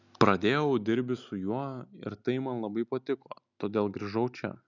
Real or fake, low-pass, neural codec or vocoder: real; 7.2 kHz; none